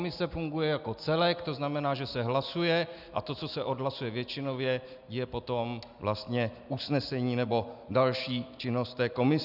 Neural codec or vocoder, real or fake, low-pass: none; real; 5.4 kHz